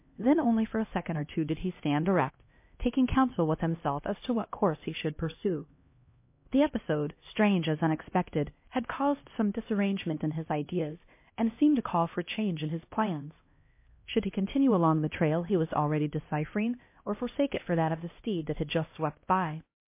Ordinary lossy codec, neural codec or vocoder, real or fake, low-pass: MP3, 24 kbps; codec, 16 kHz, 1 kbps, X-Codec, HuBERT features, trained on LibriSpeech; fake; 3.6 kHz